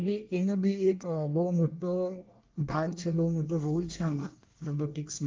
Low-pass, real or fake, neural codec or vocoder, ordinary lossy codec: 7.2 kHz; fake; codec, 24 kHz, 1 kbps, SNAC; Opus, 32 kbps